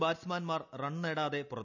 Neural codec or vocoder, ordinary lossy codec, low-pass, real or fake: none; none; 7.2 kHz; real